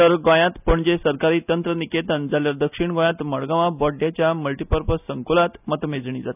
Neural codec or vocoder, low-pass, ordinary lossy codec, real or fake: none; 3.6 kHz; none; real